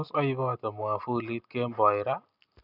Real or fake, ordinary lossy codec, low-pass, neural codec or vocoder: real; none; 5.4 kHz; none